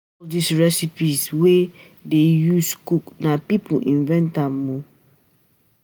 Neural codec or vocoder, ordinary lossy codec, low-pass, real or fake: none; none; none; real